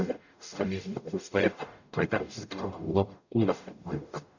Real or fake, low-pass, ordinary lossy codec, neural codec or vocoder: fake; 7.2 kHz; none; codec, 44.1 kHz, 0.9 kbps, DAC